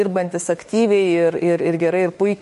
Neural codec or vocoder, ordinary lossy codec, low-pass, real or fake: autoencoder, 48 kHz, 32 numbers a frame, DAC-VAE, trained on Japanese speech; MP3, 48 kbps; 14.4 kHz; fake